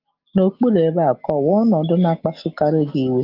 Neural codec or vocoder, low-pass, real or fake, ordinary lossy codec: none; 5.4 kHz; real; Opus, 24 kbps